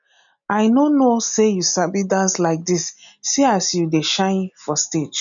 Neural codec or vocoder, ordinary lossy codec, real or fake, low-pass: none; none; real; 7.2 kHz